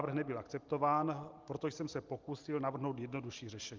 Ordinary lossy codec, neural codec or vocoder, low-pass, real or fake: Opus, 32 kbps; none; 7.2 kHz; real